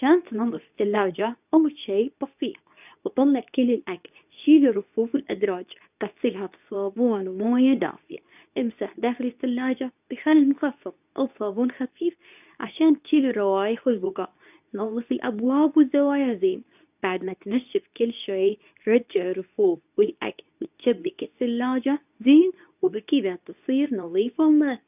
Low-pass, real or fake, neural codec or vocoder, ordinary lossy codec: 3.6 kHz; fake; codec, 24 kHz, 0.9 kbps, WavTokenizer, medium speech release version 2; none